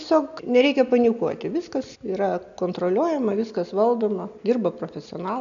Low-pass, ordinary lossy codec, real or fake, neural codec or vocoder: 7.2 kHz; AAC, 96 kbps; real; none